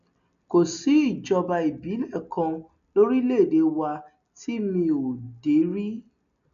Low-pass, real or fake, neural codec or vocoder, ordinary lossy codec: 7.2 kHz; real; none; none